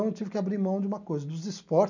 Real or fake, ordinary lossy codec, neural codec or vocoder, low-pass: real; none; none; 7.2 kHz